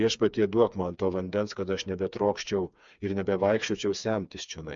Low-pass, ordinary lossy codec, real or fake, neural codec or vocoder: 7.2 kHz; MP3, 64 kbps; fake; codec, 16 kHz, 4 kbps, FreqCodec, smaller model